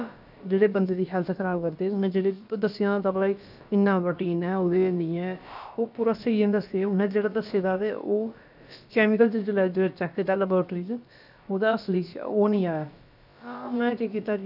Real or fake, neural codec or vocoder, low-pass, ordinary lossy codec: fake; codec, 16 kHz, about 1 kbps, DyCAST, with the encoder's durations; 5.4 kHz; none